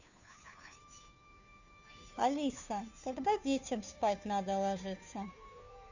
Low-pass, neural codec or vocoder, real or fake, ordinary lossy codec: 7.2 kHz; codec, 16 kHz, 2 kbps, FunCodec, trained on Chinese and English, 25 frames a second; fake; none